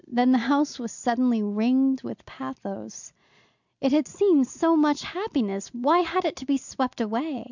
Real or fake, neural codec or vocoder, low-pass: real; none; 7.2 kHz